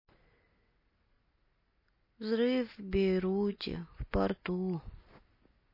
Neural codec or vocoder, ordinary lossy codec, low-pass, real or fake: none; MP3, 24 kbps; 5.4 kHz; real